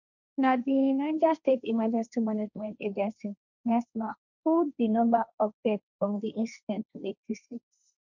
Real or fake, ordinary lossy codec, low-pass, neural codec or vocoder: fake; none; 7.2 kHz; codec, 16 kHz, 1.1 kbps, Voila-Tokenizer